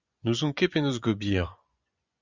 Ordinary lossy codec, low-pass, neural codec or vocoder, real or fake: Opus, 64 kbps; 7.2 kHz; vocoder, 24 kHz, 100 mel bands, Vocos; fake